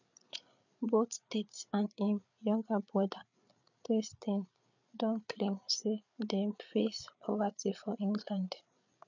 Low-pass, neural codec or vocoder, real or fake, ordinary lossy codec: 7.2 kHz; codec, 16 kHz, 8 kbps, FreqCodec, larger model; fake; none